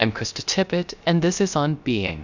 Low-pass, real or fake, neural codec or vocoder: 7.2 kHz; fake; codec, 16 kHz, 0.3 kbps, FocalCodec